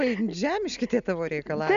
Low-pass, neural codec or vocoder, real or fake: 7.2 kHz; none; real